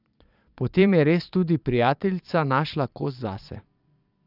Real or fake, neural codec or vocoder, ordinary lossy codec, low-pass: fake; vocoder, 22.05 kHz, 80 mel bands, Vocos; none; 5.4 kHz